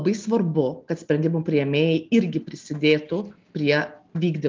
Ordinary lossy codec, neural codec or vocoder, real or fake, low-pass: Opus, 24 kbps; none; real; 7.2 kHz